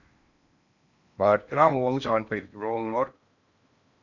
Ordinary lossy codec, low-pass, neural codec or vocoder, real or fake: none; 7.2 kHz; codec, 16 kHz in and 24 kHz out, 0.8 kbps, FocalCodec, streaming, 65536 codes; fake